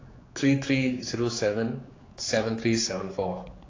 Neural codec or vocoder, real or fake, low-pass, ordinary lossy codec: codec, 16 kHz, 4 kbps, X-Codec, HuBERT features, trained on general audio; fake; 7.2 kHz; AAC, 32 kbps